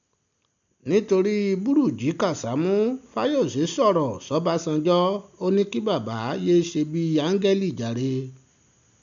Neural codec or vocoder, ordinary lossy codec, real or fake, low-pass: none; none; real; 7.2 kHz